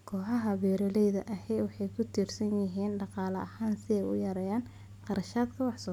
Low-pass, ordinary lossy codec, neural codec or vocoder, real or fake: 19.8 kHz; none; none; real